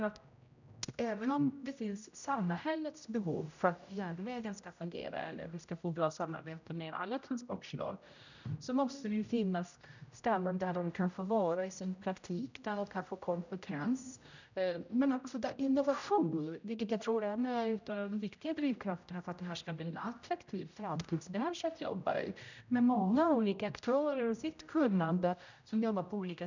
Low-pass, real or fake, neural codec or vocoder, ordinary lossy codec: 7.2 kHz; fake; codec, 16 kHz, 0.5 kbps, X-Codec, HuBERT features, trained on general audio; none